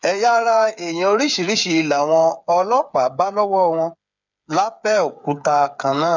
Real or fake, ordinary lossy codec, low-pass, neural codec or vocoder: fake; none; 7.2 kHz; codec, 16 kHz, 8 kbps, FreqCodec, smaller model